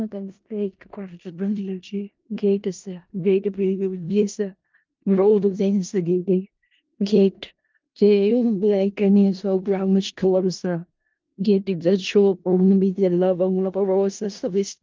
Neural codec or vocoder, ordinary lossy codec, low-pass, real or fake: codec, 16 kHz in and 24 kHz out, 0.4 kbps, LongCat-Audio-Codec, four codebook decoder; Opus, 24 kbps; 7.2 kHz; fake